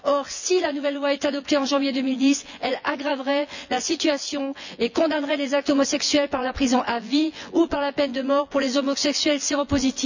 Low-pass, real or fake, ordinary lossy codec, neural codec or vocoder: 7.2 kHz; fake; none; vocoder, 24 kHz, 100 mel bands, Vocos